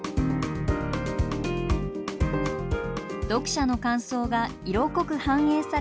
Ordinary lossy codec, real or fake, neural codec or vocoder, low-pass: none; real; none; none